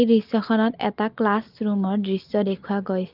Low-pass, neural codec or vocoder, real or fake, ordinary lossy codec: 5.4 kHz; none; real; Opus, 32 kbps